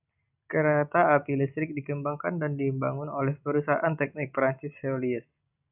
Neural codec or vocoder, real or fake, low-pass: none; real; 3.6 kHz